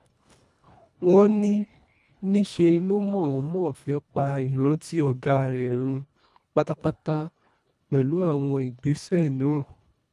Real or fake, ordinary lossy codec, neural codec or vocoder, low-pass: fake; none; codec, 24 kHz, 1.5 kbps, HILCodec; none